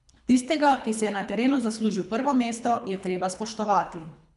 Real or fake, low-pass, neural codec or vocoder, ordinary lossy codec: fake; 10.8 kHz; codec, 24 kHz, 3 kbps, HILCodec; none